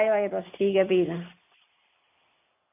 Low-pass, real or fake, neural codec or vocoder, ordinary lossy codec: 3.6 kHz; real; none; AAC, 32 kbps